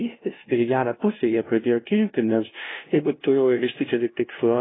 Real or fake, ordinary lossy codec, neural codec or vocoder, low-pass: fake; AAC, 16 kbps; codec, 16 kHz, 0.5 kbps, FunCodec, trained on LibriTTS, 25 frames a second; 7.2 kHz